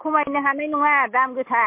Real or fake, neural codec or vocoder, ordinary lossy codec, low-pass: real; none; MP3, 24 kbps; 3.6 kHz